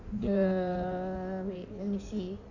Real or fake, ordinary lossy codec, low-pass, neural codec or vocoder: fake; none; 7.2 kHz; codec, 16 kHz in and 24 kHz out, 1.1 kbps, FireRedTTS-2 codec